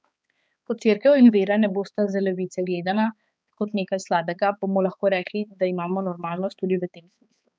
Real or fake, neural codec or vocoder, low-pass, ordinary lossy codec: fake; codec, 16 kHz, 4 kbps, X-Codec, HuBERT features, trained on balanced general audio; none; none